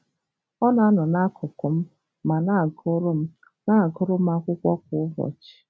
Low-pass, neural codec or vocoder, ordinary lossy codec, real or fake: none; none; none; real